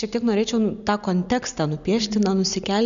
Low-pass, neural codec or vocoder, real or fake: 7.2 kHz; none; real